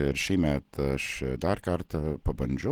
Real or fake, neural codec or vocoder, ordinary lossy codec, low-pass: real; none; Opus, 16 kbps; 19.8 kHz